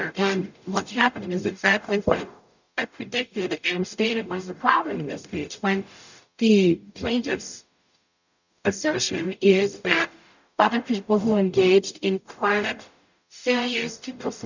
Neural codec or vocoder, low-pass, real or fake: codec, 44.1 kHz, 0.9 kbps, DAC; 7.2 kHz; fake